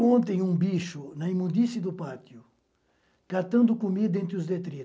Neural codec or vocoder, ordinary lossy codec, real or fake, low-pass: none; none; real; none